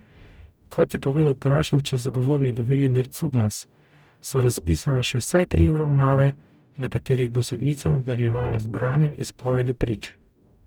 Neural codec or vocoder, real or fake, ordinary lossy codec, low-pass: codec, 44.1 kHz, 0.9 kbps, DAC; fake; none; none